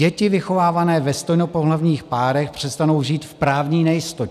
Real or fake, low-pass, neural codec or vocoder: real; 14.4 kHz; none